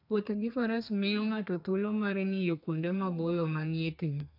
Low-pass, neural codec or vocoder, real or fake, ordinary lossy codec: 5.4 kHz; codec, 32 kHz, 1.9 kbps, SNAC; fake; AAC, 48 kbps